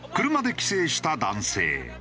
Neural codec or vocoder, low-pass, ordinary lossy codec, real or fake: none; none; none; real